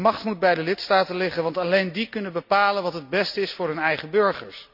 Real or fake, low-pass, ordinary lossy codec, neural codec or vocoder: real; 5.4 kHz; none; none